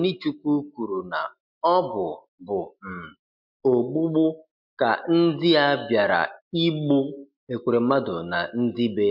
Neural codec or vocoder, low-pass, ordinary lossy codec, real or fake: none; 5.4 kHz; MP3, 48 kbps; real